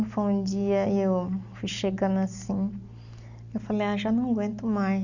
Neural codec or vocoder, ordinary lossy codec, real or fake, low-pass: none; none; real; 7.2 kHz